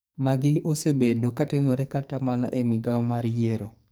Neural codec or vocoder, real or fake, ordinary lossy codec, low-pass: codec, 44.1 kHz, 2.6 kbps, SNAC; fake; none; none